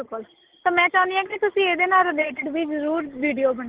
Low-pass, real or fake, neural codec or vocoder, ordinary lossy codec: 3.6 kHz; real; none; Opus, 16 kbps